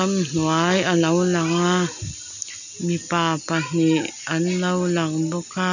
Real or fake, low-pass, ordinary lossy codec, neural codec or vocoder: real; 7.2 kHz; none; none